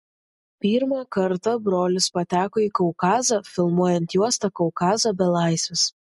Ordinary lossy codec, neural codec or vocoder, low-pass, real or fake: MP3, 48 kbps; none; 14.4 kHz; real